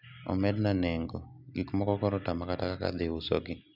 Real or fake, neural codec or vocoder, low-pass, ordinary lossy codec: real; none; 5.4 kHz; none